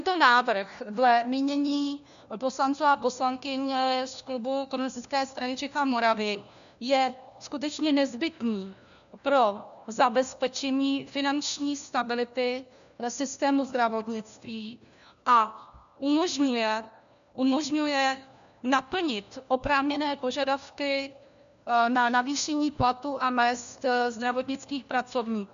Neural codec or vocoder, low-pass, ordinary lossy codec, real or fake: codec, 16 kHz, 1 kbps, FunCodec, trained on LibriTTS, 50 frames a second; 7.2 kHz; MP3, 96 kbps; fake